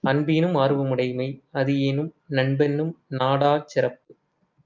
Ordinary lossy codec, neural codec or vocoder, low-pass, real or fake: Opus, 24 kbps; none; 7.2 kHz; real